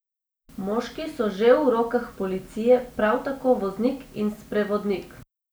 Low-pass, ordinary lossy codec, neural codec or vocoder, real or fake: none; none; none; real